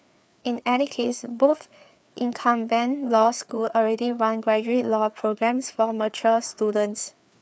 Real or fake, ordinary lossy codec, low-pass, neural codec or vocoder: fake; none; none; codec, 16 kHz, 4 kbps, FreqCodec, larger model